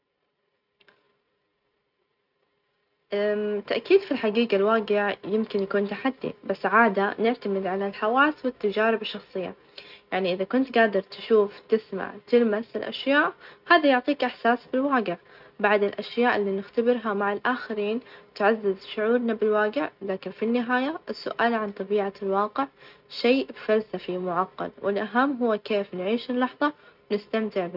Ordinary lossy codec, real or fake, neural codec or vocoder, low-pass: none; real; none; 5.4 kHz